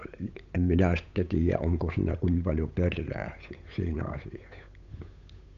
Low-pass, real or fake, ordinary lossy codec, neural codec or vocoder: 7.2 kHz; fake; none; codec, 16 kHz, 8 kbps, FunCodec, trained on Chinese and English, 25 frames a second